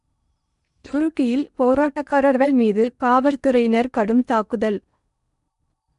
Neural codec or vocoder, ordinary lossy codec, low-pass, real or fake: codec, 16 kHz in and 24 kHz out, 0.8 kbps, FocalCodec, streaming, 65536 codes; none; 10.8 kHz; fake